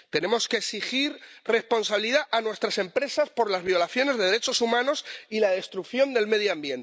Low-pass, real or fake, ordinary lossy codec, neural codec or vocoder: none; real; none; none